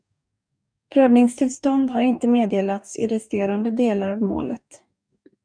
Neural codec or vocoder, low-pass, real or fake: codec, 44.1 kHz, 2.6 kbps, DAC; 9.9 kHz; fake